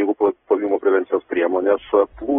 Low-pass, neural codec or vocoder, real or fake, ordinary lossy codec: 19.8 kHz; vocoder, 44.1 kHz, 128 mel bands every 256 samples, BigVGAN v2; fake; AAC, 16 kbps